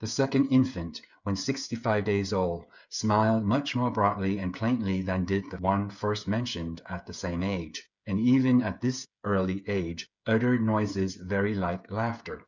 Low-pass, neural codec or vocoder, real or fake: 7.2 kHz; codec, 16 kHz, 8 kbps, FreqCodec, smaller model; fake